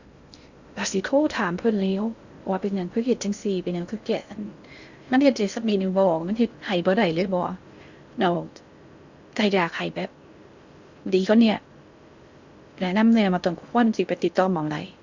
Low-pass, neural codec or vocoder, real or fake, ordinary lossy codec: 7.2 kHz; codec, 16 kHz in and 24 kHz out, 0.6 kbps, FocalCodec, streaming, 2048 codes; fake; Opus, 64 kbps